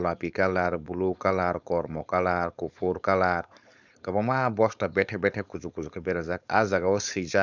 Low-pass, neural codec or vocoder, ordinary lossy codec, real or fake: 7.2 kHz; codec, 16 kHz, 4.8 kbps, FACodec; none; fake